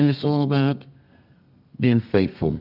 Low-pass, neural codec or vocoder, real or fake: 5.4 kHz; codec, 32 kHz, 1.9 kbps, SNAC; fake